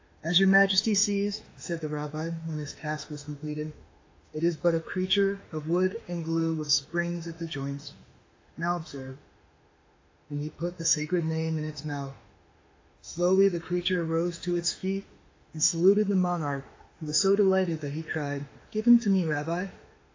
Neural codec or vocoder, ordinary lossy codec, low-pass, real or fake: autoencoder, 48 kHz, 32 numbers a frame, DAC-VAE, trained on Japanese speech; AAC, 32 kbps; 7.2 kHz; fake